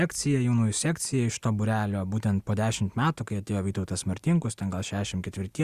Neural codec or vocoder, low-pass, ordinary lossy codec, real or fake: none; 14.4 kHz; Opus, 64 kbps; real